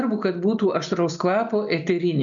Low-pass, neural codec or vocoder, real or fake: 7.2 kHz; none; real